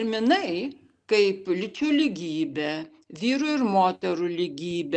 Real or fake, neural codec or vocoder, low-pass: real; none; 9.9 kHz